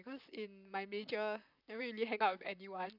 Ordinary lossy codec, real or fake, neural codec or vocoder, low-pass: none; fake; vocoder, 44.1 kHz, 128 mel bands, Pupu-Vocoder; 5.4 kHz